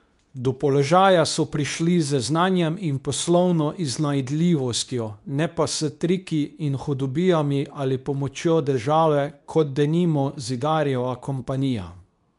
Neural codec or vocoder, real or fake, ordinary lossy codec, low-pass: codec, 24 kHz, 0.9 kbps, WavTokenizer, medium speech release version 2; fake; none; 10.8 kHz